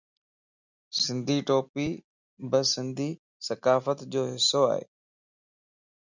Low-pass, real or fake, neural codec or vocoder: 7.2 kHz; real; none